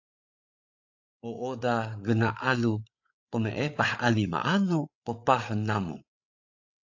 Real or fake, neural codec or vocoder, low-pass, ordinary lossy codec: fake; codec, 16 kHz, 8 kbps, FreqCodec, larger model; 7.2 kHz; AAC, 48 kbps